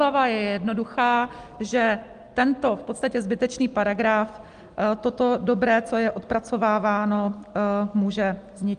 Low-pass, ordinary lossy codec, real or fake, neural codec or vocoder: 9.9 kHz; Opus, 24 kbps; real; none